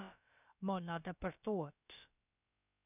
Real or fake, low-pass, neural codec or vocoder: fake; 3.6 kHz; codec, 16 kHz, about 1 kbps, DyCAST, with the encoder's durations